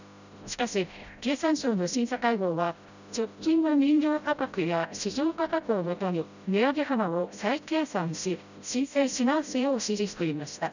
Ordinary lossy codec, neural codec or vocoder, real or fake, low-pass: none; codec, 16 kHz, 0.5 kbps, FreqCodec, smaller model; fake; 7.2 kHz